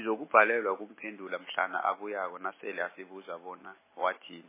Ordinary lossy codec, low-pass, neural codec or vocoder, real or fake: MP3, 16 kbps; 3.6 kHz; none; real